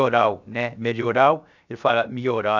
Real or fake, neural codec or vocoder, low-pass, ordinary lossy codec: fake; codec, 16 kHz, 0.7 kbps, FocalCodec; 7.2 kHz; none